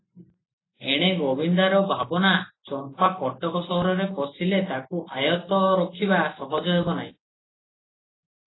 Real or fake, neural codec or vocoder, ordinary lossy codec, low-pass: real; none; AAC, 16 kbps; 7.2 kHz